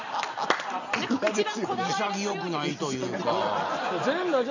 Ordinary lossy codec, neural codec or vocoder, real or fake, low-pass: none; none; real; 7.2 kHz